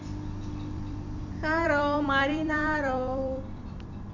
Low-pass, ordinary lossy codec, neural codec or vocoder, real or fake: 7.2 kHz; none; vocoder, 44.1 kHz, 128 mel bands every 256 samples, BigVGAN v2; fake